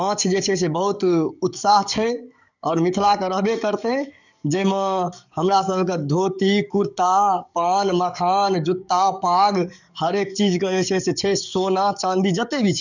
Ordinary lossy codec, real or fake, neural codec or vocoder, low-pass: none; fake; codec, 44.1 kHz, 7.8 kbps, DAC; 7.2 kHz